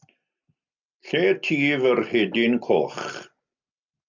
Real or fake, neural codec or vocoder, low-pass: real; none; 7.2 kHz